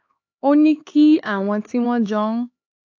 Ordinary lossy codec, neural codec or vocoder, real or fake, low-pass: AAC, 48 kbps; codec, 16 kHz, 4 kbps, X-Codec, HuBERT features, trained on LibriSpeech; fake; 7.2 kHz